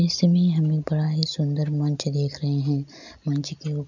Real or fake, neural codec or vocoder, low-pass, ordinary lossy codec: real; none; 7.2 kHz; none